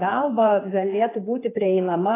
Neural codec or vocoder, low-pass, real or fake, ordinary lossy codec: codec, 16 kHz, 4 kbps, X-Codec, HuBERT features, trained on general audio; 3.6 kHz; fake; AAC, 16 kbps